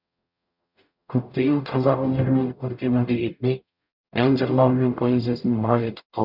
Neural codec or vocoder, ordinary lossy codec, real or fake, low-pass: codec, 44.1 kHz, 0.9 kbps, DAC; none; fake; 5.4 kHz